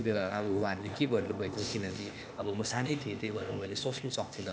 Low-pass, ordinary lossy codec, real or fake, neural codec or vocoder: none; none; fake; codec, 16 kHz, 0.8 kbps, ZipCodec